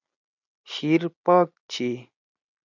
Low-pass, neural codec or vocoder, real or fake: 7.2 kHz; none; real